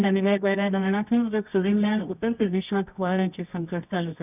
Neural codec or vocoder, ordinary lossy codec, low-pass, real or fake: codec, 24 kHz, 0.9 kbps, WavTokenizer, medium music audio release; none; 3.6 kHz; fake